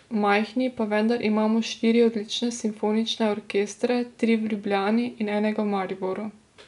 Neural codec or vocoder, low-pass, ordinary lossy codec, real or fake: none; 10.8 kHz; none; real